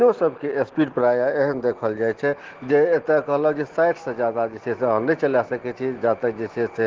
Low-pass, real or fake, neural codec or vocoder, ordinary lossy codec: 7.2 kHz; real; none; Opus, 16 kbps